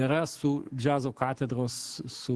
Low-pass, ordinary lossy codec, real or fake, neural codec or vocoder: 10.8 kHz; Opus, 16 kbps; fake; autoencoder, 48 kHz, 128 numbers a frame, DAC-VAE, trained on Japanese speech